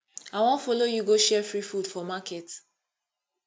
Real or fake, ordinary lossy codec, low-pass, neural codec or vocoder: real; none; none; none